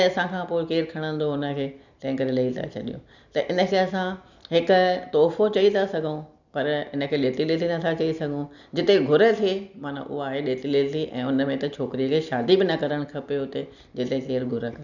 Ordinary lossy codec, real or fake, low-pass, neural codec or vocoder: none; real; 7.2 kHz; none